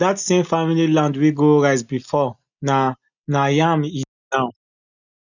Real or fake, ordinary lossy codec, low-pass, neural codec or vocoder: real; none; 7.2 kHz; none